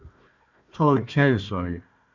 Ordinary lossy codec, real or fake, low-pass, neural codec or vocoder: Opus, 64 kbps; fake; 7.2 kHz; codec, 16 kHz, 1 kbps, FunCodec, trained on Chinese and English, 50 frames a second